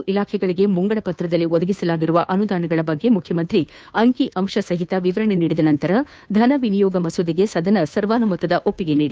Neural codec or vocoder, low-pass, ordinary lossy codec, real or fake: codec, 16 kHz, 2 kbps, FunCodec, trained on Chinese and English, 25 frames a second; none; none; fake